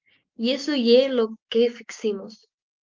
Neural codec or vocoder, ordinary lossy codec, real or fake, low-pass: codec, 16 kHz, 6 kbps, DAC; Opus, 24 kbps; fake; 7.2 kHz